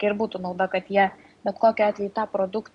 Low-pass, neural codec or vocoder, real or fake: 10.8 kHz; none; real